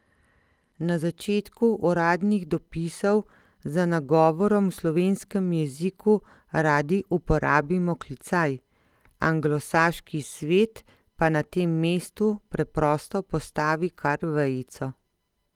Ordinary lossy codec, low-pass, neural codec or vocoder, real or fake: Opus, 24 kbps; 19.8 kHz; none; real